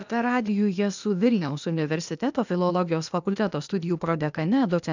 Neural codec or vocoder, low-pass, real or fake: codec, 16 kHz, 0.8 kbps, ZipCodec; 7.2 kHz; fake